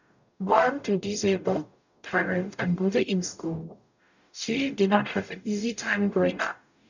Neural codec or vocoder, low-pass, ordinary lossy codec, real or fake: codec, 44.1 kHz, 0.9 kbps, DAC; 7.2 kHz; none; fake